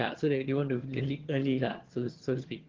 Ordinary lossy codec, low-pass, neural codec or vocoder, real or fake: Opus, 16 kbps; 7.2 kHz; vocoder, 22.05 kHz, 80 mel bands, HiFi-GAN; fake